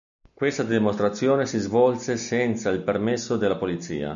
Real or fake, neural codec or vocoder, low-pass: real; none; 7.2 kHz